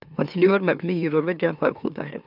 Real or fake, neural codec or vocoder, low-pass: fake; autoencoder, 44.1 kHz, a latent of 192 numbers a frame, MeloTTS; 5.4 kHz